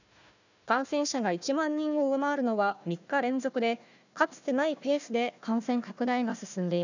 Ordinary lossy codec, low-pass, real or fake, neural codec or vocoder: none; 7.2 kHz; fake; codec, 16 kHz, 1 kbps, FunCodec, trained on Chinese and English, 50 frames a second